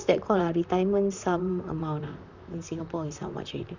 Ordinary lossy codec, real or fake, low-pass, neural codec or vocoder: none; fake; 7.2 kHz; vocoder, 44.1 kHz, 128 mel bands, Pupu-Vocoder